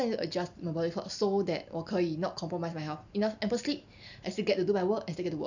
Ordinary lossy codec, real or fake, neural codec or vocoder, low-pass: none; real; none; 7.2 kHz